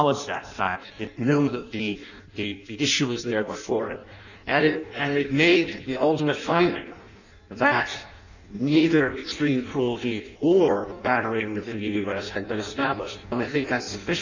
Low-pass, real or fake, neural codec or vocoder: 7.2 kHz; fake; codec, 16 kHz in and 24 kHz out, 0.6 kbps, FireRedTTS-2 codec